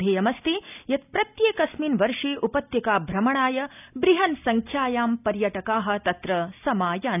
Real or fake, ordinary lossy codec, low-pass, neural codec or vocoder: real; none; 3.6 kHz; none